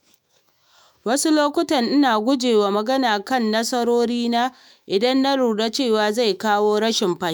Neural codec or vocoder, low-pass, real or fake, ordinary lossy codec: autoencoder, 48 kHz, 128 numbers a frame, DAC-VAE, trained on Japanese speech; none; fake; none